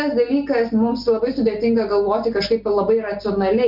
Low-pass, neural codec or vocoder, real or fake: 5.4 kHz; none; real